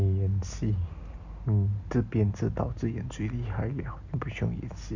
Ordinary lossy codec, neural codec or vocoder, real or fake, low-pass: none; none; real; 7.2 kHz